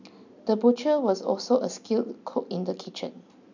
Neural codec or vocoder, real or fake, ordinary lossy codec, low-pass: none; real; none; 7.2 kHz